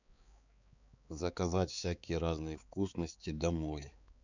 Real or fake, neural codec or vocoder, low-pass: fake; codec, 16 kHz, 4 kbps, X-Codec, HuBERT features, trained on balanced general audio; 7.2 kHz